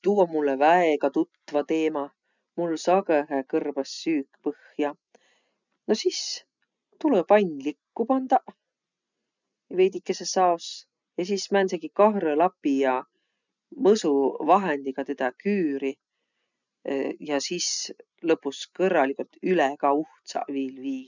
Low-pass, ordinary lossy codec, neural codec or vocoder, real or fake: 7.2 kHz; none; none; real